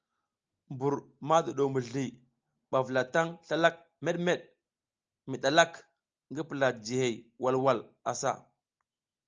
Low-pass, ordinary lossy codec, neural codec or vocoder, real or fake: 7.2 kHz; Opus, 24 kbps; none; real